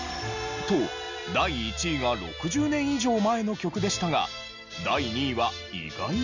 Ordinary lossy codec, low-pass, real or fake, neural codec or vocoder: none; 7.2 kHz; real; none